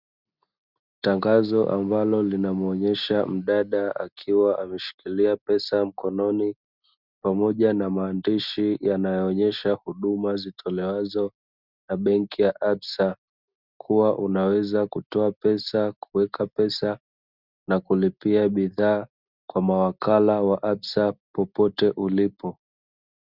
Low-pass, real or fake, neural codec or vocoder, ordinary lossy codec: 5.4 kHz; real; none; Opus, 64 kbps